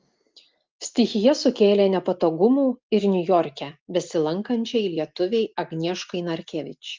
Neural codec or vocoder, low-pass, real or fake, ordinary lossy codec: none; 7.2 kHz; real; Opus, 32 kbps